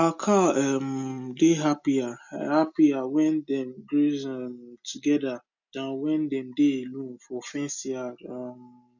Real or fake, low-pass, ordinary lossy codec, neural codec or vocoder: real; 7.2 kHz; none; none